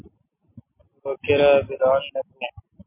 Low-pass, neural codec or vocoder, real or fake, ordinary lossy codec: 3.6 kHz; none; real; MP3, 32 kbps